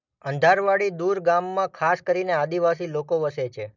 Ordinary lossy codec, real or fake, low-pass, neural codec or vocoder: none; real; 7.2 kHz; none